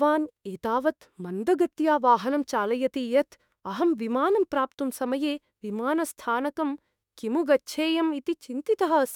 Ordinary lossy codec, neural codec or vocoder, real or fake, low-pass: none; autoencoder, 48 kHz, 32 numbers a frame, DAC-VAE, trained on Japanese speech; fake; 19.8 kHz